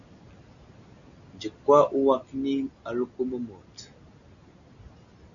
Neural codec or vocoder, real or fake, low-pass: none; real; 7.2 kHz